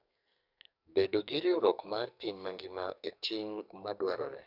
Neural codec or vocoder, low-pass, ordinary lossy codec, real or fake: codec, 44.1 kHz, 2.6 kbps, SNAC; 5.4 kHz; none; fake